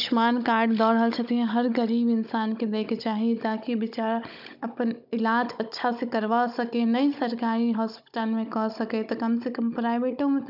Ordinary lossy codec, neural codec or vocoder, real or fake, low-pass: none; codec, 16 kHz, 16 kbps, FunCodec, trained on Chinese and English, 50 frames a second; fake; 5.4 kHz